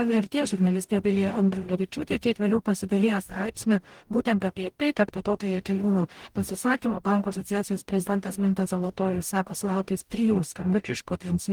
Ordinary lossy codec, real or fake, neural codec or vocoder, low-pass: Opus, 24 kbps; fake; codec, 44.1 kHz, 0.9 kbps, DAC; 19.8 kHz